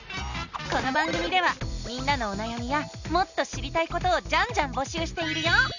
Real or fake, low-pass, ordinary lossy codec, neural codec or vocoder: real; 7.2 kHz; none; none